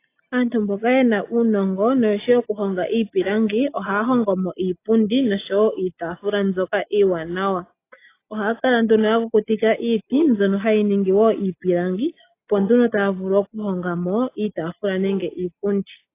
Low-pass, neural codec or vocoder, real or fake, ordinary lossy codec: 3.6 kHz; none; real; AAC, 24 kbps